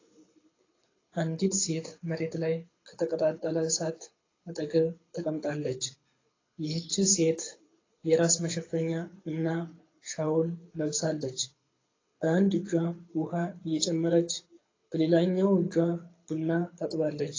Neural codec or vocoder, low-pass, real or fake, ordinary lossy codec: codec, 24 kHz, 6 kbps, HILCodec; 7.2 kHz; fake; AAC, 32 kbps